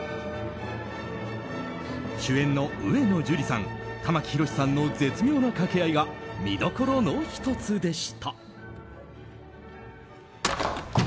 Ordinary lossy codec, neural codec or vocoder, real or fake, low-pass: none; none; real; none